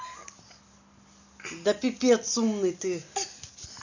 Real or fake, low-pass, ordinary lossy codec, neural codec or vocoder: real; 7.2 kHz; none; none